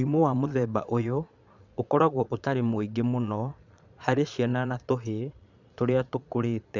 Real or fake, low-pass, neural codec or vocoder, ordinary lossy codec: fake; 7.2 kHz; vocoder, 22.05 kHz, 80 mel bands, Vocos; none